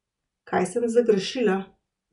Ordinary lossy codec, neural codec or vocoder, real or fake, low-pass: none; none; real; 10.8 kHz